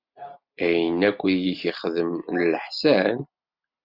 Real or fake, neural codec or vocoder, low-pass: real; none; 5.4 kHz